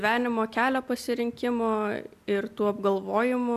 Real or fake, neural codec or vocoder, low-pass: real; none; 14.4 kHz